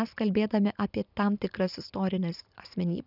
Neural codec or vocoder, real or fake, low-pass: none; real; 5.4 kHz